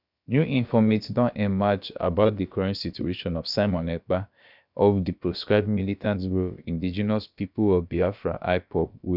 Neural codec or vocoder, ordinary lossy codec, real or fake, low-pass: codec, 16 kHz, about 1 kbps, DyCAST, with the encoder's durations; none; fake; 5.4 kHz